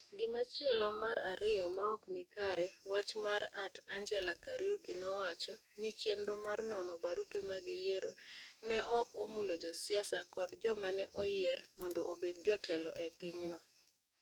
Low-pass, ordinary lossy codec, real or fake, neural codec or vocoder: 19.8 kHz; none; fake; codec, 44.1 kHz, 2.6 kbps, DAC